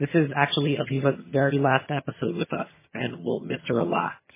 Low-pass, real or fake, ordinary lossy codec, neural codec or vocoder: 3.6 kHz; fake; MP3, 16 kbps; vocoder, 22.05 kHz, 80 mel bands, HiFi-GAN